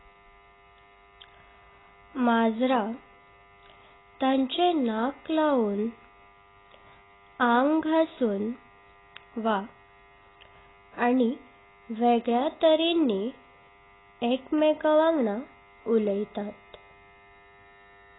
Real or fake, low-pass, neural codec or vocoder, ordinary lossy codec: real; 7.2 kHz; none; AAC, 16 kbps